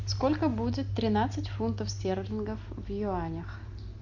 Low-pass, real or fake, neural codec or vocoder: 7.2 kHz; real; none